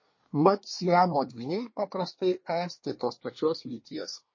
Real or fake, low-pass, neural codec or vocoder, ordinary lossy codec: fake; 7.2 kHz; codec, 24 kHz, 1 kbps, SNAC; MP3, 32 kbps